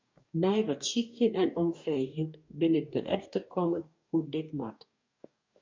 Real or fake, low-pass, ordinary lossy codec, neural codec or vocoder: fake; 7.2 kHz; MP3, 64 kbps; codec, 44.1 kHz, 2.6 kbps, DAC